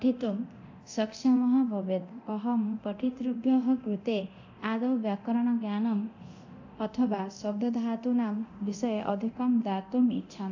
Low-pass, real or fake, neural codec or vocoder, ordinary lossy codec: 7.2 kHz; fake; codec, 24 kHz, 0.9 kbps, DualCodec; AAC, 48 kbps